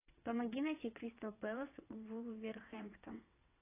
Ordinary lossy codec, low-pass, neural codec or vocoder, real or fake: AAC, 24 kbps; 3.6 kHz; vocoder, 22.05 kHz, 80 mel bands, WaveNeXt; fake